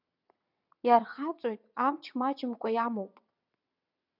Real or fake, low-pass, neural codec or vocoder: fake; 5.4 kHz; vocoder, 22.05 kHz, 80 mel bands, WaveNeXt